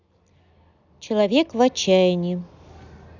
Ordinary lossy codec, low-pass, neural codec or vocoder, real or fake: none; 7.2 kHz; none; real